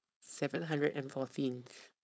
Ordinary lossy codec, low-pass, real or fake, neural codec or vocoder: none; none; fake; codec, 16 kHz, 4.8 kbps, FACodec